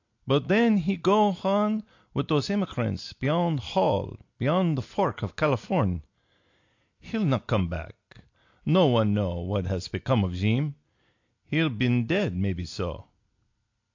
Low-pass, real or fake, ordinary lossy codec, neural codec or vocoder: 7.2 kHz; real; AAC, 48 kbps; none